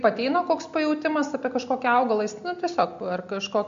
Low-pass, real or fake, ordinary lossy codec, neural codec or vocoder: 7.2 kHz; real; MP3, 48 kbps; none